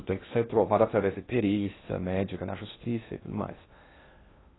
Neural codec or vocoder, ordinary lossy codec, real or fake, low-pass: codec, 16 kHz in and 24 kHz out, 0.6 kbps, FocalCodec, streaming, 2048 codes; AAC, 16 kbps; fake; 7.2 kHz